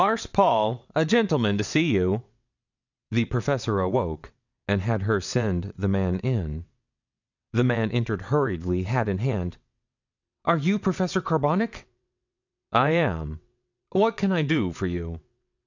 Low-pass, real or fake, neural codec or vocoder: 7.2 kHz; fake; vocoder, 22.05 kHz, 80 mel bands, WaveNeXt